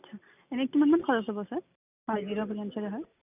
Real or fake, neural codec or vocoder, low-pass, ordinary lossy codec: real; none; 3.6 kHz; none